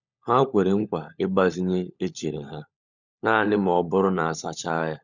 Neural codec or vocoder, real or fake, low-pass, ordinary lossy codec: codec, 16 kHz, 16 kbps, FunCodec, trained on LibriTTS, 50 frames a second; fake; 7.2 kHz; none